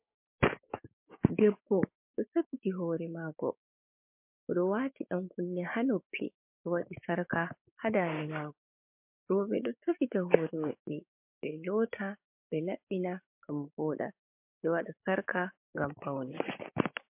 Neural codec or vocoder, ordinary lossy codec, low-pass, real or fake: codec, 16 kHz, 6 kbps, DAC; MP3, 32 kbps; 3.6 kHz; fake